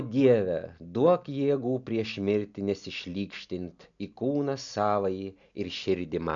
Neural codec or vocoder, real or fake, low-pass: none; real; 7.2 kHz